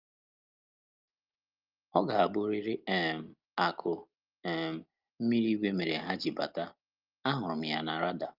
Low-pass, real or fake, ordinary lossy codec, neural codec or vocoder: 5.4 kHz; real; Opus, 32 kbps; none